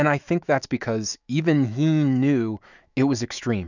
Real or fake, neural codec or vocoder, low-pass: fake; autoencoder, 48 kHz, 128 numbers a frame, DAC-VAE, trained on Japanese speech; 7.2 kHz